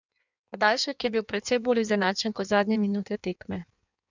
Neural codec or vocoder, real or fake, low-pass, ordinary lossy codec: codec, 16 kHz in and 24 kHz out, 1.1 kbps, FireRedTTS-2 codec; fake; 7.2 kHz; none